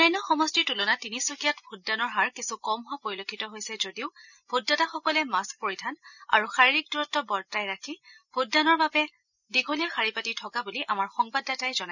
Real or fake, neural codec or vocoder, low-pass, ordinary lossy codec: real; none; 7.2 kHz; none